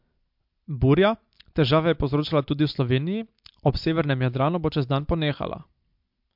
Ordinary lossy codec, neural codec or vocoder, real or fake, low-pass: MP3, 48 kbps; none; real; 5.4 kHz